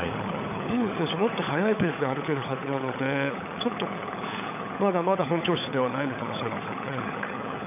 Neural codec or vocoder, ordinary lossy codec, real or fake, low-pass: codec, 16 kHz, 16 kbps, FunCodec, trained on LibriTTS, 50 frames a second; none; fake; 3.6 kHz